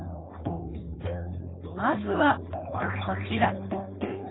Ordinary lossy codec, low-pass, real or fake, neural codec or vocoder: AAC, 16 kbps; 7.2 kHz; fake; codec, 16 kHz, 4.8 kbps, FACodec